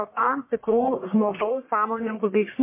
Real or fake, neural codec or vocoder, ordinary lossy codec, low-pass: fake; codec, 44.1 kHz, 1.7 kbps, Pupu-Codec; MP3, 24 kbps; 3.6 kHz